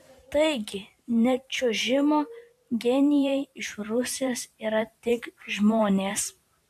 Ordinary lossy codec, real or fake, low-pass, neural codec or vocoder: AAC, 96 kbps; fake; 14.4 kHz; vocoder, 44.1 kHz, 128 mel bands every 512 samples, BigVGAN v2